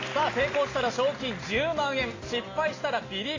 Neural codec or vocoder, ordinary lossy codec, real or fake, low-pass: none; AAC, 32 kbps; real; 7.2 kHz